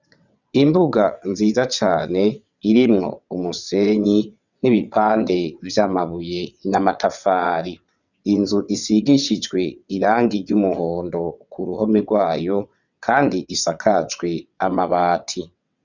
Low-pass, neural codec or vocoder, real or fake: 7.2 kHz; vocoder, 22.05 kHz, 80 mel bands, WaveNeXt; fake